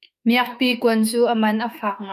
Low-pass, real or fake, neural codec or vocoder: 14.4 kHz; fake; autoencoder, 48 kHz, 32 numbers a frame, DAC-VAE, trained on Japanese speech